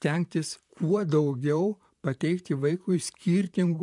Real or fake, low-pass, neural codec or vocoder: fake; 10.8 kHz; vocoder, 44.1 kHz, 128 mel bands, Pupu-Vocoder